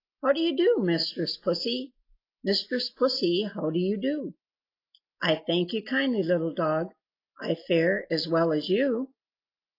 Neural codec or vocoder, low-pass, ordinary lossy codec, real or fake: none; 5.4 kHz; AAC, 32 kbps; real